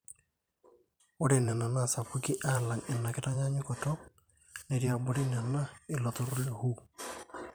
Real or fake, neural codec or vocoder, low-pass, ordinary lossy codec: fake; vocoder, 44.1 kHz, 128 mel bands every 512 samples, BigVGAN v2; none; none